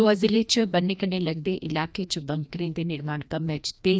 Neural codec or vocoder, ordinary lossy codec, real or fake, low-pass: codec, 16 kHz, 1 kbps, FreqCodec, larger model; none; fake; none